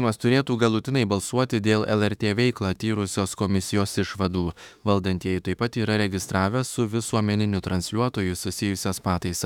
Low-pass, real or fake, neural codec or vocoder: 19.8 kHz; fake; autoencoder, 48 kHz, 32 numbers a frame, DAC-VAE, trained on Japanese speech